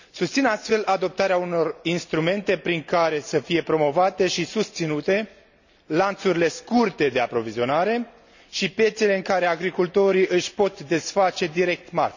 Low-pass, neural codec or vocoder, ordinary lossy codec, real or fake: 7.2 kHz; none; none; real